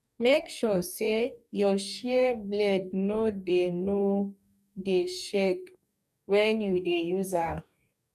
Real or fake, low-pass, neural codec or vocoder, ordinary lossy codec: fake; 14.4 kHz; codec, 44.1 kHz, 2.6 kbps, DAC; none